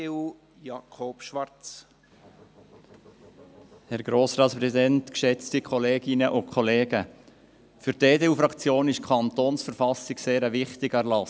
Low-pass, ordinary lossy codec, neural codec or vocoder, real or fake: none; none; none; real